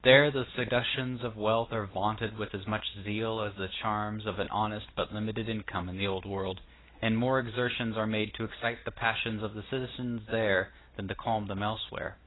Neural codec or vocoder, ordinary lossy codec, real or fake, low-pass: none; AAC, 16 kbps; real; 7.2 kHz